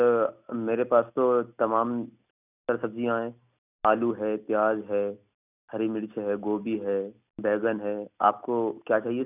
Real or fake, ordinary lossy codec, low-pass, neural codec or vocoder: real; none; 3.6 kHz; none